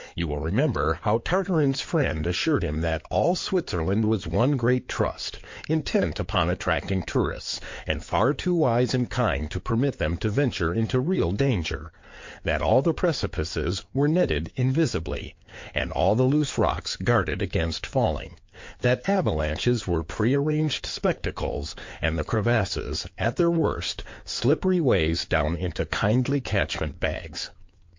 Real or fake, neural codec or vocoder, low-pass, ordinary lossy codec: fake; codec, 16 kHz in and 24 kHz out, 2.2 kbps, FireRedTTS-2 codec; 7.2 kHz; MP3, 48 kbps